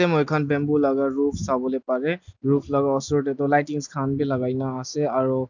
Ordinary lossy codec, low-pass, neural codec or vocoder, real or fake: none; 7.2 kHz; none; real